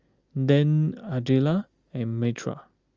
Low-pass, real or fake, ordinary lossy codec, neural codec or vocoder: 7.2 kHz; real; Opus, 32 kbps; none